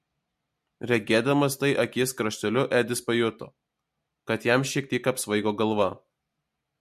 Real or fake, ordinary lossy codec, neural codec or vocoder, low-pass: real; MP3, 64 kbps; none; 14.4 kHz